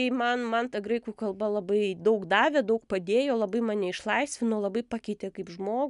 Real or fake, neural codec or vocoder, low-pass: real; none; 10.8 kHz